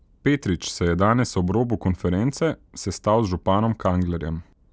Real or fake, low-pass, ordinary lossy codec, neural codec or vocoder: real; none; none; none